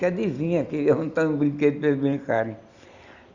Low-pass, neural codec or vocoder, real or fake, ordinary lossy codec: 7.2 kHz; none; real; Opus, 64 kbps